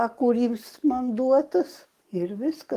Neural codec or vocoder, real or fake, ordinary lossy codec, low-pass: codec, 44.1 kHz, 7.8 kbps, DAC; fake; Opus, 24 kbps; 14.4 kHz